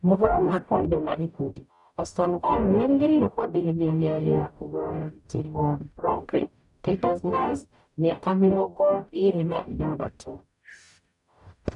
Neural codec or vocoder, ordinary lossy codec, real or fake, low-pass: codec, 44.1 kHz, 0.9 kbps, DAC; none; fake; 10.8 kHz